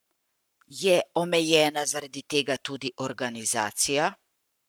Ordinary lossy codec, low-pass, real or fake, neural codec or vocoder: none; none; fake; codec, 44.1 kHz, 7.8 kbps, Pupu-Codec